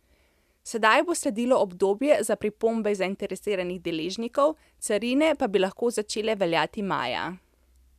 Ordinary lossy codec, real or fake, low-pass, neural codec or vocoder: none; real; 14.4 kHz; none